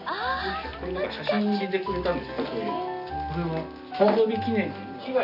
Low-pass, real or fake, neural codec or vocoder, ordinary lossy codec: 5.4 kHz; real; none; none